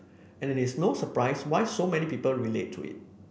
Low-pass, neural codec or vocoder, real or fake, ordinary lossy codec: none; none; real; none